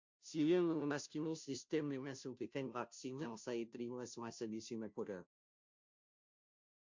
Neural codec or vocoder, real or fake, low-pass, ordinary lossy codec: codec, 16 kHz, 0.5 kbps, FunCodec, trained on Chinese and English, 25 frames a second; fake; 7.2 kHz; MP3, 48 kbps